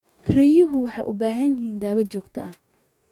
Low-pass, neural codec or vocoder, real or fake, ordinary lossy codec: 19.8 kHz; codec, 44.1 kHz, 2.6 kbps, DAC; fake; none